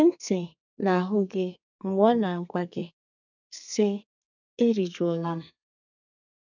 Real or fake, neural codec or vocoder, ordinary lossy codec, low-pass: fake; codec, 32 kHz, 1.9 kbps, SNAC; none; 7.2 kHz